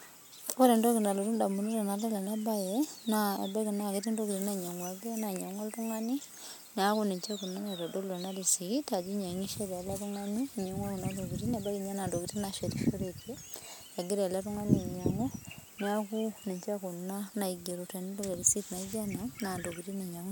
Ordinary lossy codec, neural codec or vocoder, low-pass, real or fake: none; none; none; real